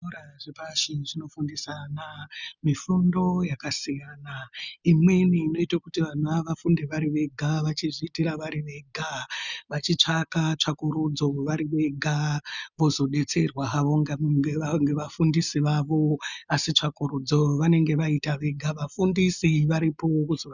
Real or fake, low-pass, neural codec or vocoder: real; 7.2 kHz; none